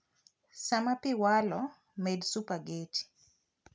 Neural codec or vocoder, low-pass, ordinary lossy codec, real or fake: none; none; none; real